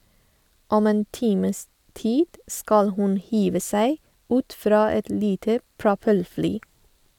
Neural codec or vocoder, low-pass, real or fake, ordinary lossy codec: none; 19.8 kHz; real; none